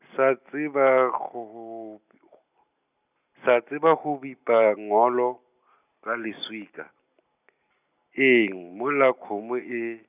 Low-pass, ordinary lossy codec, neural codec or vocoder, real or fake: 3.6 kHz; none; none; real